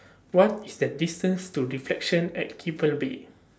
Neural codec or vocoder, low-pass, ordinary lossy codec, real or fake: none; none; none; real